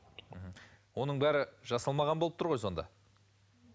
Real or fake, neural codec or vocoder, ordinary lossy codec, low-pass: real; none; none; none